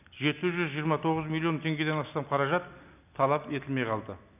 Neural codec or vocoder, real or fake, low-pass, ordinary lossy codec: none; real; 3.6 kHz; none